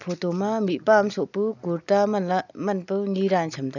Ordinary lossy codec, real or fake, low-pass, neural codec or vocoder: none; real; 7.2 kHz; none